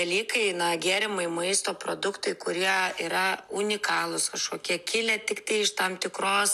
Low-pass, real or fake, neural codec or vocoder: 14.4 kHz; real; none